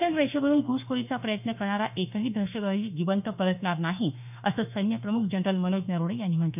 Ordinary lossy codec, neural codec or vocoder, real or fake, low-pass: none; autoencoder, 48 kHz, 32 numbers a frame, DAC-VAE, trained on Japanese speech; fake; 3.6 kHz